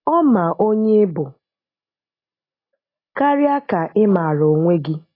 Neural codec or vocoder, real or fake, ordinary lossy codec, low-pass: none; real; AAC, 24 kbps; 5.4 kHz